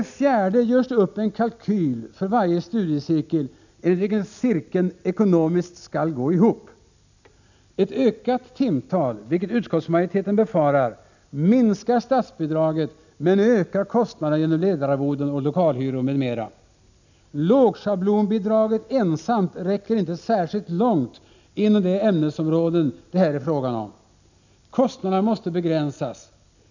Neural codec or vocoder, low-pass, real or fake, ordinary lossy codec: none; 7.2 kHz; real; none